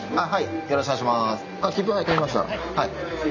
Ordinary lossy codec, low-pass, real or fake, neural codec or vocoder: none; 7.2 kHz; real; none